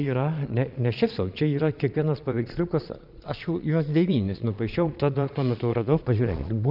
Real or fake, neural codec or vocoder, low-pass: fake; vocoder, 22.05 kHz, 80 mel bands, Vocos; 5.4 kHz